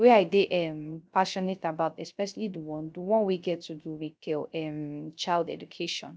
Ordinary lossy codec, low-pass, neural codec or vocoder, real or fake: none; none; codec, 16 kHz, 0.3 kbps, FocalCodec; fake